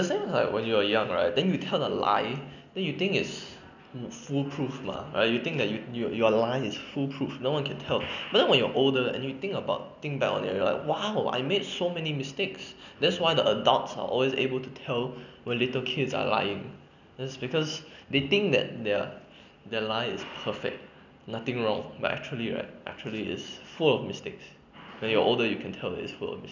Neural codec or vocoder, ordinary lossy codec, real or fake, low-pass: none; none; real; 7.2 kHz